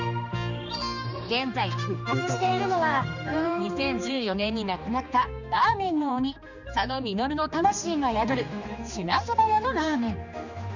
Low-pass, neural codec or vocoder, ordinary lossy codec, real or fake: 7.2 kHz; codec, 16 kHz, 2 kbps, X-Codec, HuBERT features, trained on general audio; none; fake